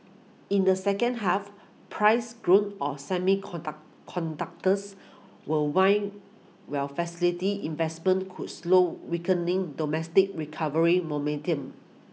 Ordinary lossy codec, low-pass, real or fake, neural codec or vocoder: none; none; real; none